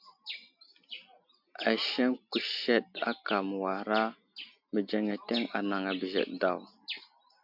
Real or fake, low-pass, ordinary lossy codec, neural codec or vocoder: real; 5.4 kHz; MP3, 48 kbps; none